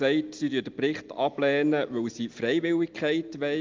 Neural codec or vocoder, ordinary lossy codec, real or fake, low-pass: none; Opus, 24 kbps; real; 7.2 kHz